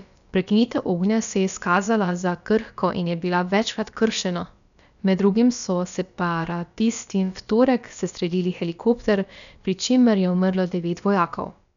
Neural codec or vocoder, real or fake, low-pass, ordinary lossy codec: codec, 16 kHz, about 1 kbps, DyCAST, with the encoder's durations; fake; 7.2 kHz; none